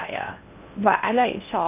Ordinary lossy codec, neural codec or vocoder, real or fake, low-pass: none; codec, 16 kHz in and 24 kHz out, 0.6 kbps, FocalCodec, streaming, 4096 codes; fake; 3.6 kHz